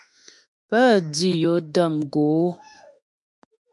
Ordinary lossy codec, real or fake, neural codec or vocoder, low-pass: MP3, 96 kbps; fake; autoencoder, 48 kHz, 32 numbers a frame, DAC-VAE, trained on Japanese speech; 10.8 kHz